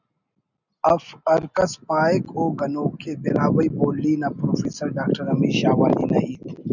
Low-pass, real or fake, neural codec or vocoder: 7.2 kHz; real; none